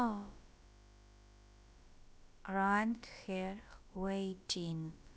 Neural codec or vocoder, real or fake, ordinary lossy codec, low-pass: codec, 16 kHz, about 1 kbps, DyCAST, with the encoder's durations; fake; none; none